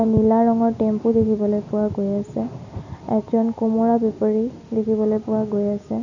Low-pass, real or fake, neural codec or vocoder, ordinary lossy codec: 7.2 kHz; real; none; none